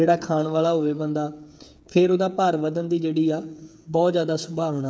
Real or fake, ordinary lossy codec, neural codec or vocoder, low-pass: fake; none; codec, 16 kHz, 8 kbps, FreqCodec, smaller model; none